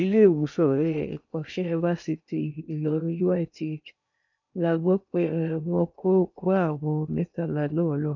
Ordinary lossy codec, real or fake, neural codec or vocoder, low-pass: none; fake; codec, 16 kHz in and 24 kHz out, 0.8 kbps, FocalCodec, streaming, 65536 codes; 7.2 kHz